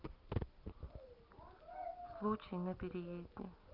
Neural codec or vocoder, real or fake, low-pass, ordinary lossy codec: none; real; 5.4 kHz; Opus, 24 kbps